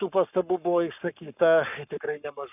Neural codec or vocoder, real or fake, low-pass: codec, 44.1 kHz, 7.8 kbps, Pupu-Codec; fake; 3.6 kHz